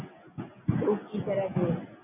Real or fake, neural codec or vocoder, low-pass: real; none; 3.6 kHz